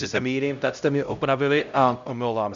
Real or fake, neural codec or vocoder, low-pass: fake; codec, 16 kHz, 0.5 kbps, X-Codec, HuBERT features, trained on LibriSpeech; 7.2 kHz